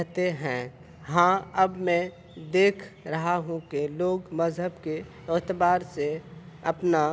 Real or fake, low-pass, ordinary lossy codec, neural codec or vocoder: real; none; none; none